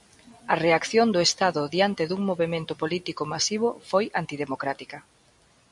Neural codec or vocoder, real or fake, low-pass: none; real; 10.8 kHz